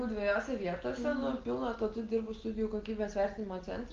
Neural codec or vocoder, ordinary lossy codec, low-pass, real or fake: none; Opus, 16 kbps; 7.2 kHz; real